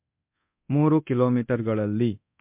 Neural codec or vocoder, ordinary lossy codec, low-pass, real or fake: codec, 24 kHz, 0.9 kbps, DualCodec; MP3, 32 kbps; 3.6 kHz; fake